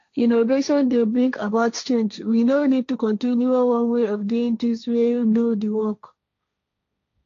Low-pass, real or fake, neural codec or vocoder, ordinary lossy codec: 7.2 kHz; fake; codec, 16 kHz, 1.1 kbps, Voila-Tokenizer; AAC, 48 kbps